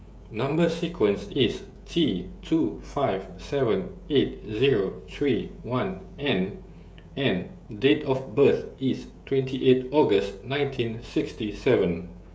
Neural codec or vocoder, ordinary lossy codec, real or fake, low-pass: codec, 16 kHz, 16 kbps, FreqCodec, smaller model; none; fake; none